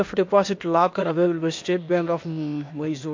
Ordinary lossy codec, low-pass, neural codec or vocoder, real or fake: MP3, 48 kbps; 7.2 kHz; codec, 16 kHz, 0.8 kbps, ZipCodec; fake